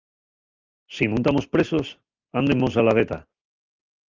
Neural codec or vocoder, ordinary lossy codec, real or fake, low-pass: none; Opus, 32 kbps; real; 7.2 kHz